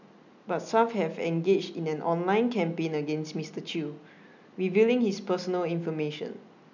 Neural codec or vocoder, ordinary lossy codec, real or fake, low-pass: none; none; real; 7.2 kHz